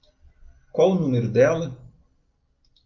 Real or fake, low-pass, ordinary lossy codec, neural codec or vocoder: real; 7.2 kHz; Opus, 24 kbps; none